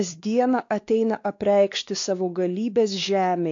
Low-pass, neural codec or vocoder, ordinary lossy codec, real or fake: 7.2 kHz; codec, 16 kHz, 2 kbps, X-Codec, WavLM features, trained on Multilingual LibriSpeech; AAC, 48 kbps; fake